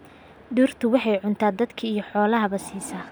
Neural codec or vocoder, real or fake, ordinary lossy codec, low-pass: none; real; none; none